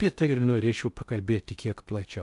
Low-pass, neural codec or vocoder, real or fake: 10.8 kHz; codec, 16 kHz in and 24 kHz out, 0.6 kbps, FocalCodec, streaming, 2048 codes; fake